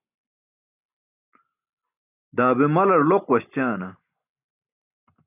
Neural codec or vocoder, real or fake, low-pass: none; real; 3.6 kHz